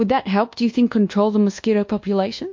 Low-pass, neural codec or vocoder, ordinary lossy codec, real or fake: 7.2 kHz; codec, 24 kHz, 1.2 kbps, DualCodec; MP3, 48 kbps; fake